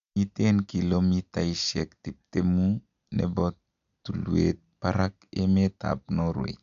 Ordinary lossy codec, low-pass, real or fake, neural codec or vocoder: AAC, 96 kbps; 7.2 kHz; real; none